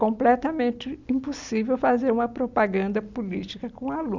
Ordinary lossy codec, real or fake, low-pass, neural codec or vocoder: none; real; 7.2 kHz; none